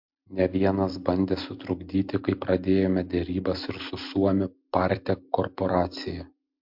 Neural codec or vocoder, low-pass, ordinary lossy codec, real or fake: none; 5.4 kHz; MP3, 48 kbps; real